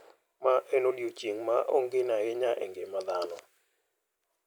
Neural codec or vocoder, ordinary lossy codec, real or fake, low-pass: none; none; real; none